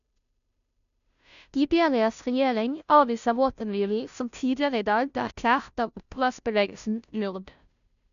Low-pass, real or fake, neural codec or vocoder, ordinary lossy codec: 7.2 kHz; fake; codec, 16 kHz, 0.5 kbps, FunCodec, trained on Chinese and English, 25 frames a second; none